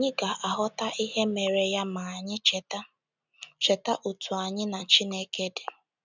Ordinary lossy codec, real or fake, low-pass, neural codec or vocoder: none; real; 7.2 kHz; none